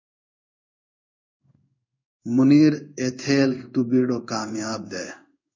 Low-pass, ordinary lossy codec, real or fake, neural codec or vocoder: 7.2 kHz; MP3, 64 kbps; fake; codec, 16 kHz in and 24 kHz out, 1 kbps, XY-Tokenizer